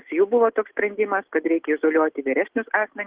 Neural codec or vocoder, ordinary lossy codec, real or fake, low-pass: none; Opus, 16 kbps; real; 3.6 kHz